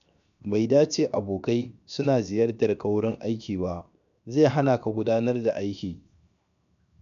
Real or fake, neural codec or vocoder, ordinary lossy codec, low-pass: fake; codec, 16 kHz, 0.7 kbps, FocalCodec; none; 7.2 kHz